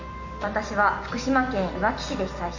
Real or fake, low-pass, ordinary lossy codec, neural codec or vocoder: real; 7.2 kHz; Opus, 64 kbps; none